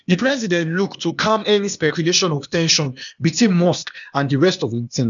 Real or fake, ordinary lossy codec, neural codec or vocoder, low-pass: fake; none; codec, 16 kHz, 0.8 kbps, ZipCodec; 7.2 kHz